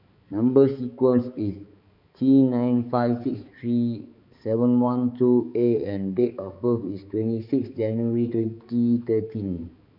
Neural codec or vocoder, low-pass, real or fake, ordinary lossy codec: codec, 16 kHz, 4 kbps, X-Codec, HuBERT features, trained on balanced general audio; 5.4 kHz; fake; none